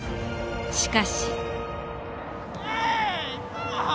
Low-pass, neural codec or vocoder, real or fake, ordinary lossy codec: none; none; real; none